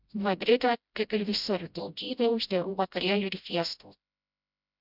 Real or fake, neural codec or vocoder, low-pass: fake; codec, 16 kHz, 0.5 kbps, FreqCodec, smaller model; 5.4 kHz